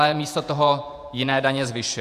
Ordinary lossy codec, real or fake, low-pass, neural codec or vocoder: AAC, 96 kbps; fake; 14.4 kHz; vocoder, 48 kHz, 128 mel bands, Vocos